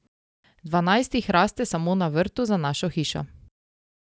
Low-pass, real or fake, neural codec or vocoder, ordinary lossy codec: none; real; none; none